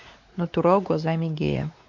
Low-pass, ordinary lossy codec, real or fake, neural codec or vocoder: 7.2 kHz; MP3, 48 kbps; fake; codec, 16 kHz, 4 kbps, X-Codec, WavLM features, trained on Multilingual LibriSpeech